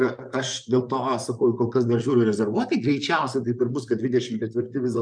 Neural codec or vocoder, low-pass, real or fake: vocoder, 44.1 kHz, 128 mel bands, Pupu-Vocoder; 9.9 kHz; fake